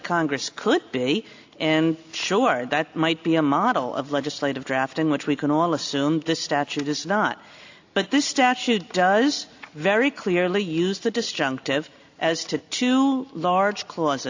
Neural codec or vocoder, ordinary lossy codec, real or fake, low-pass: none; AAC, 48 kbps; real; 7.2 kHz